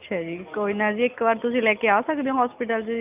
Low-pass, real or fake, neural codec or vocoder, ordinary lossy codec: 3.6 kHz; real; none; none